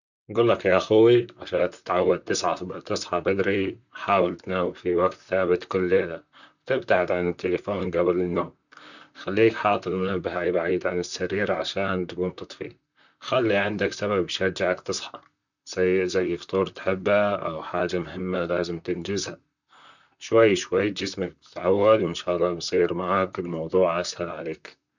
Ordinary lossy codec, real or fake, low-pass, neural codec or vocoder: none; fake; 7.2 kHz; vocoder, 44.1 kHz, 128 mel bands, Pupu-Vocoder